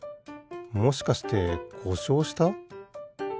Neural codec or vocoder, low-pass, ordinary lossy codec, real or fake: none; none; none; real